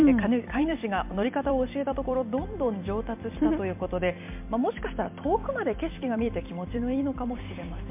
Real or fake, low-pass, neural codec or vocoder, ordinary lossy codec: fake; 3.6 kHz; vocoder, 44.1 kHz, 128 mel bands every 256 samples, BigVGAN v2; MP3, 32 kbps